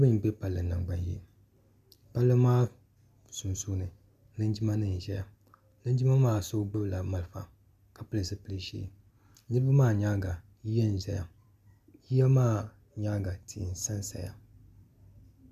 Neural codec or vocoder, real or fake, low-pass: none; real; 14.4 kHz